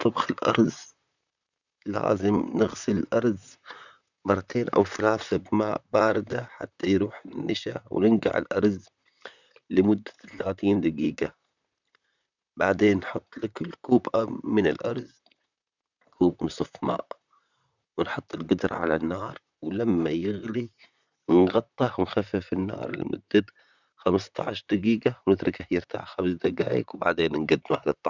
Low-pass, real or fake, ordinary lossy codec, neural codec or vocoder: 7.2 kHz; fake; none; vocoder, 44.1 kHz, 128 mel bands, Pupu-Vocoder